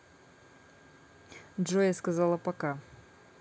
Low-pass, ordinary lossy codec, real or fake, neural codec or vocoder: none; none; real; none